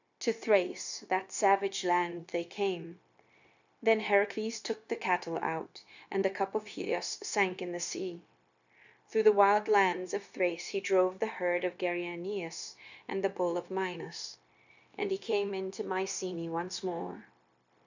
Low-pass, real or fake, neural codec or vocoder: 7.2 kHz; fake; codec, 16 kHz, 0.9 kbps, LongCat-Audio-Codec